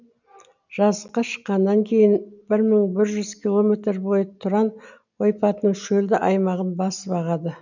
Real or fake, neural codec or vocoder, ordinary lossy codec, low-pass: real; none; none; 7.2 kHz